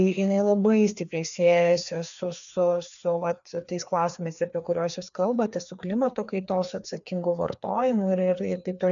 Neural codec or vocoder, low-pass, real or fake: codec, 16 kHz, 2 kbps, FreqCodec, larger model; 7.2 kHz; fake